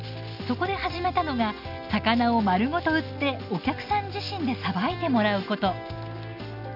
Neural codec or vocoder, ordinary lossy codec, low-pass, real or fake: none; none; 5.4 kHz; real